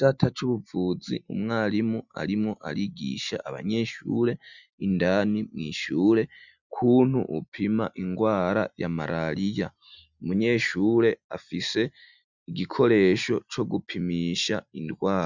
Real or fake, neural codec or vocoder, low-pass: real; none; 7.2 kHz